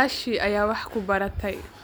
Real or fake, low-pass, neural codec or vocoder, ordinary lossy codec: real; none; none; none